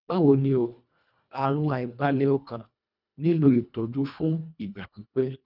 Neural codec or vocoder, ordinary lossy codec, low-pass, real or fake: codec, 24 kHz, 1.5 kbps, HILCodec; none; 5.4 kHz; fake